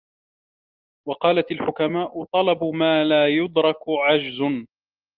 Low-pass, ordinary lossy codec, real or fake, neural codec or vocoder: 5.4 kHz; Opus, 32 kbps; real; none